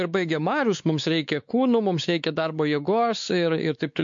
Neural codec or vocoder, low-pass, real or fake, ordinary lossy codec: codec, 16 kHz, 4 kbps, X-Codec, WavLM features, trained on Multilingual LibriSpeech; 7.2 kHz; fake; MP3, 48 kbps